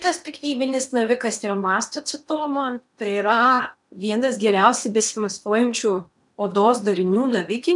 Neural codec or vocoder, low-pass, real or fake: codec, 16 kHz in and 24 kHz out, 0.8 kbps, FocalCodec, streaming, 65536 codes; 10.8 kHz; fake